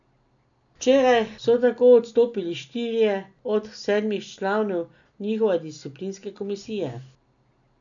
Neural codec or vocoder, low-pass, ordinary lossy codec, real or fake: none; 7.2 kHz; none; real